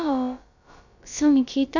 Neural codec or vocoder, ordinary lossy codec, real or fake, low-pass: codec, 16 kHz, about 1 kbps, DyCAST, with the encoder's durations; none; fake; 7.2 kHz